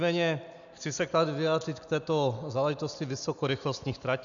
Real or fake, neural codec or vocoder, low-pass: real; none; 7.2 kHz